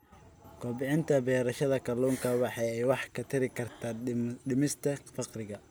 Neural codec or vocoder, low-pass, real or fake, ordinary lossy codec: none; none; real; none